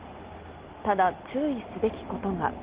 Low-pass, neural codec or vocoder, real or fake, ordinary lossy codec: 3.6 kHz; none; real; Opus, 16 kbps